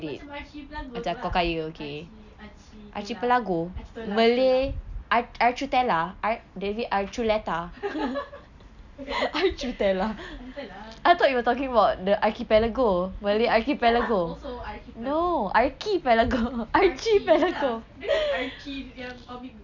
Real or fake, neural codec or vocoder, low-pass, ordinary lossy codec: real; none; 7.2 kHz; none